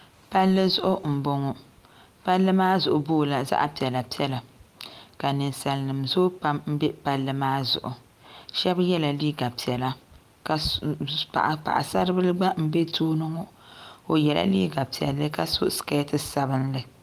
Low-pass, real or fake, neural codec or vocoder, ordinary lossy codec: 14.4 kHz; real; none; Opus, 32 kbps